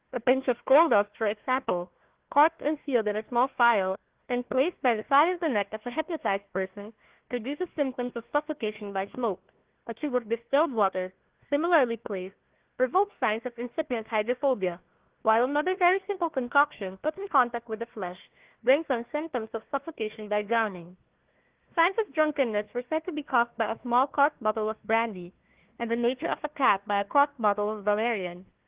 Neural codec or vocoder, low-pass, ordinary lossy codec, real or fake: codec, 16 kHz, 1 kbps, FunCodec, trained on Chinese and English, 50 frames a second; 3.6 kHz; Opus, 16 kbps; fake